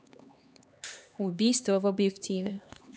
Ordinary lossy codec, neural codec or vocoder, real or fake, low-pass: none; codec, 16 kHz, 2 kbps, X-Codec, HuBERT features, trained on LibriSpeech; fake; none